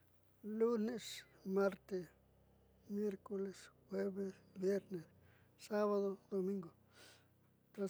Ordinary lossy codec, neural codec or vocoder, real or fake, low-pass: none; none; real; none